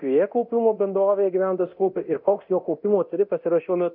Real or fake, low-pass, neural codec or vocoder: fake; 5.4 kHz; codec, 24 kHz, 0.9 kbps, DualCodec